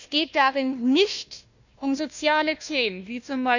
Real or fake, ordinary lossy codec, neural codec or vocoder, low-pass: fake; none; codec, 16 kHz, 1 kbps, FunCodec, trained on Chinese and English, 50 frames a second; 7.2 kHz